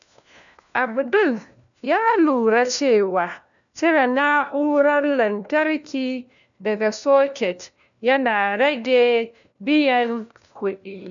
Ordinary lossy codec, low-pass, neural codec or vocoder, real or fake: none; 7.2 kHz; codec, 16 kHz, 1 kbps, FunCodec, trained on LibriTTS, 50 frames a second; fake